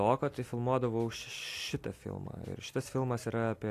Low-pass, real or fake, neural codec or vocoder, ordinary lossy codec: 14.4 kHz; real; none; AAC, 64 kbps